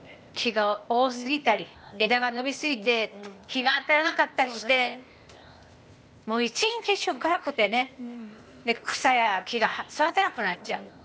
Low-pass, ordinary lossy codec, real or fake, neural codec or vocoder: none; none; fake; codec, 16 kHz, 0.8 kbps, ZipCodec